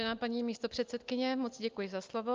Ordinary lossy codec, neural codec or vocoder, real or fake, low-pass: Opus, 24 kbps; none; real; 7.2 kHz